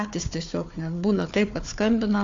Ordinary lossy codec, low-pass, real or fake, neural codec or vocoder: AAC, 48 kbps; 7.2 kHz; fake; codec, 16 kHz, 4 kbps, FunCodec, trained on Chinese and English, 50 frames a second